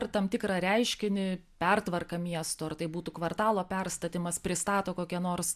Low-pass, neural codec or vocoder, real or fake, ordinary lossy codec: 14.4 kHz; none; real; AAC, 96 kbps